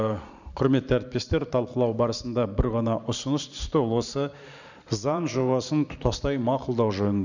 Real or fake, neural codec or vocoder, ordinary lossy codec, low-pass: fake; vocoder, 44.1 kHz, 128 mel bands every 512 samples, BigVGAN v2; none; 7.2 kHz